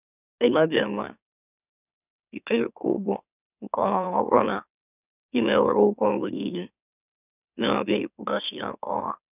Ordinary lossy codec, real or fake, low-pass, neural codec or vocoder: none; fake; 3.6 kHz; autoencoder, 44.1 kHz, a latent of 192 numbers a frame, MeloTTS